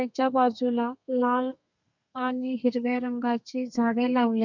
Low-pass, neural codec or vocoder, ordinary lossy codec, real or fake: 7.2 kHz; codec, 32 kHz, 1.9 kbps, SNAC; none; fake